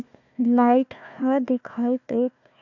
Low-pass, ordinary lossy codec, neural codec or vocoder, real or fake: 7.2 kHz; none; codec, 16 kHz, 1 kbps, FunCodec, trained on Chinese and English, 50 frames a second; fake